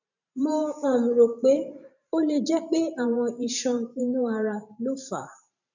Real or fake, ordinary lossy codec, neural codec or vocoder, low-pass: fake; none; vocoder, 44.1 kHz, 128 mel bands every 512 samples, BigVGAN v2; 7.2 kHz